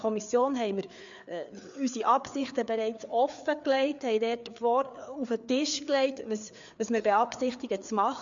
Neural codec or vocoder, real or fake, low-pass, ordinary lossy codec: codec, 16 kHz, 4 kbps, FreqCodec, larger model; fake; 7.2 kHz; MP3, 64 kbps